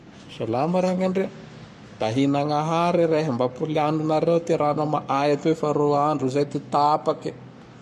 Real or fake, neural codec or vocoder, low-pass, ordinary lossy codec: fake; codec, 44.1 kHz, 7.8 kbps, Pupu-Codec; 14.4 kHz; MP3, 64 kbps